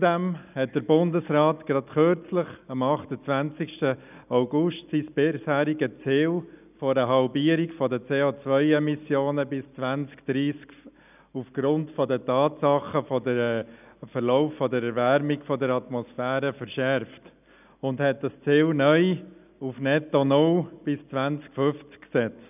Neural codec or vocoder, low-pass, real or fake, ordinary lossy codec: none; 3.6 kHz; real; none